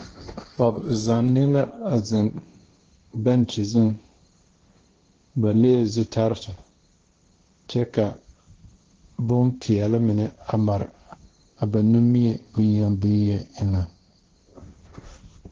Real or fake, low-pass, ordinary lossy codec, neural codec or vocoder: fake; 7.2 kHz; Opus, 16 kbps; codec, 16 kHz, 1.1 kbps, Voila-Tokenizer